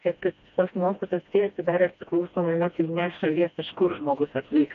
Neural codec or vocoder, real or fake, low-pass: codec, 16 kHz, 1 kbps, FreqCodec, smaller model; fake; 7.2 kHz